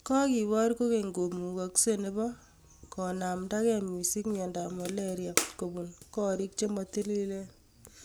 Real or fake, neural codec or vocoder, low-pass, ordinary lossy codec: real; none; none; none